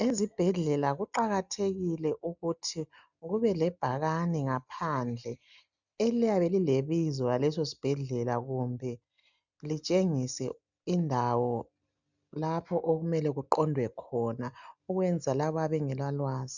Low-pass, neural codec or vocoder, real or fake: 7.2 kHz; none; real